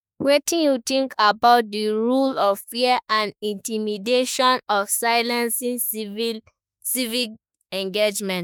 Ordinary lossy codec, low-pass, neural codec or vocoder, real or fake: none; none; autoencoder, 48 kHz, 32 numbers a frame, DAC-VAE, trained on Japanese speech; fake